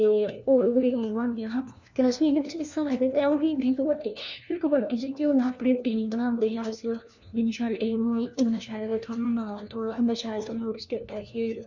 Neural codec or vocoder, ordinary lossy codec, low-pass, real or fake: codec, 16 kHz, 1 kbps, FunCodec, trained on LibriTTS, 50 frames a second; none; 7.2 kHz; fake